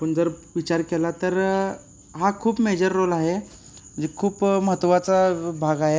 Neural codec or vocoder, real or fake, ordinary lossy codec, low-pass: none; real; none; none